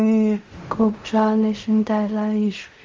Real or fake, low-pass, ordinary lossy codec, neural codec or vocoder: fake; 7.2 kHz; Opus, 32 kbps; codec, 16 kHz in and 24 kHz out, 0.4 kbps, LongCat-Audio-Codec, fine tuned four codebook decoder